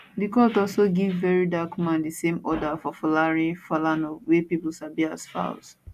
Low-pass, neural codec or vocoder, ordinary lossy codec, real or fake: 14.4 kHz; none; none; real